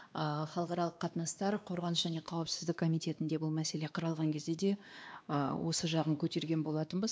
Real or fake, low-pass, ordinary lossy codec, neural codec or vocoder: fake; none; none; codec, 16 kHz, 2 kbps, X-Codec, WavLM features, trained on Multilingual LibriSpeech